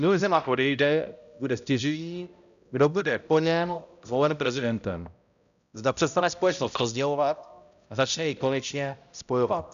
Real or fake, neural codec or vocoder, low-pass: fake; codec, 16 kHz, 0.5 kbps, X-Codec, HuBERT features, trained on balanced general audio; 7.2 kHz